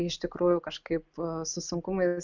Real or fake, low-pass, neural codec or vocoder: real; 7.2 kHz; none